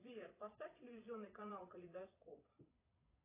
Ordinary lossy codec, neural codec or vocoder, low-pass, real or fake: AAC, 24 kbps; vocoder, 22.05 kHz, 80 mel bands, Vocos; 3.6 kHz; fake